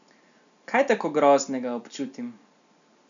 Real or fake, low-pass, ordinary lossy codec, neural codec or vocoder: real; 7.2 kHz; none; none